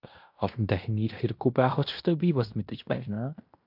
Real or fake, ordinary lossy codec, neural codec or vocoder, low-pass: fake; MP3, 48 kbps; codec, 16 kHz in and 24 kHz out, 0.9 kbps, LongCat-Audio-Codec, fine tuned four codebook decoder; 5.4 kHz